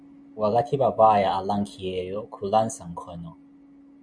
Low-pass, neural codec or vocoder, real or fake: 9.9 kHz; none; real